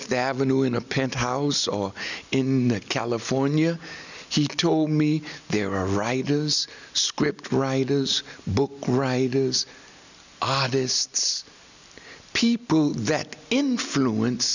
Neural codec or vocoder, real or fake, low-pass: none; real; 7.2 kHz